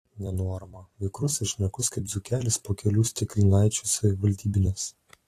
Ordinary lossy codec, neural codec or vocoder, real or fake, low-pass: AAC, 48 kbps; vocoder, 44.1 kHz, 128 mel bands every 256 samples, BigVGAN v2; fake; 14.4 kHz